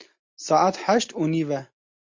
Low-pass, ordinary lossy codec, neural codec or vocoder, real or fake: 7.2 kHz; MP3, 48 kbps; none; real